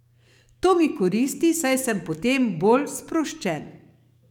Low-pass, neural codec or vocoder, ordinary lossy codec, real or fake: 19.8 kHz; codec, 44.1 kHz, 7.8 kbps, DAC; none; fake